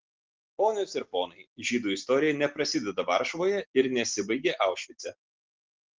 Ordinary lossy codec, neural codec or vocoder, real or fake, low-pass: Opus, 16 kbps; none; real; 7.2 kHz